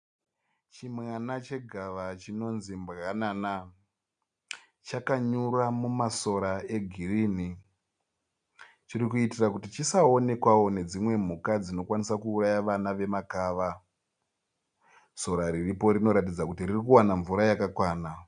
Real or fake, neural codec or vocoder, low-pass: real; none; 9.9 kHz